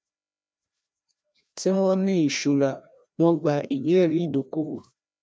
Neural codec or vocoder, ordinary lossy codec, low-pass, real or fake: codec, 16 kHz, 1 kbps, FreqCodec, larger model; none; none; fake